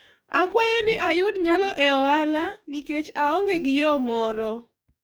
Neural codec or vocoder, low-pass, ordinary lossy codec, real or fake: codec, 44.1 kHz, 2.6 kbps, DAC; none; none; fake